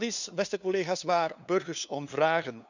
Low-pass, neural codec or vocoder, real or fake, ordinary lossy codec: 7.2 kHz; codec, 16 kHz, 2 kbps, FunCodec, trained on LibriTTS, 25 frames a second; fake; none